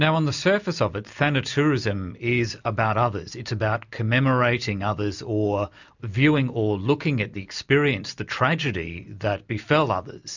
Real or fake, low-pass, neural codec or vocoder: real; 7.2 kHz; none